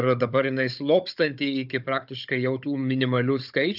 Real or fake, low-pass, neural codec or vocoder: fake; 5.4 kHz; codec, 16 kHz, 16 kbps, FunCodec, trained on Chinese and English, 50 frames a second